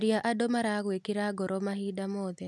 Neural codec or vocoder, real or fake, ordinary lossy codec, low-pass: none; real; none; none